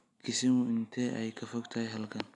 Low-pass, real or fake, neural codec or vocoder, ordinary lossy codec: 10.8 kHz; real; none; none